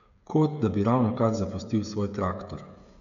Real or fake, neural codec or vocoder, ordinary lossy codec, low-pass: fake; codec, 16 kHz, 16 kbps, FreqCodec, smaller model; none; 7.2 kHz